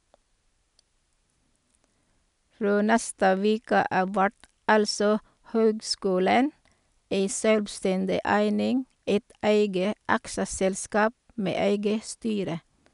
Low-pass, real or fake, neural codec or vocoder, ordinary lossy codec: 10.8 kHz; real; none; none